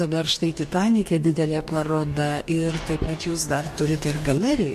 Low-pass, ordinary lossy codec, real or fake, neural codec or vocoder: 14.4 kHz; MP3, 64 kbps; fake; codec, 44.1 kHz, 2.6 kbps, DAC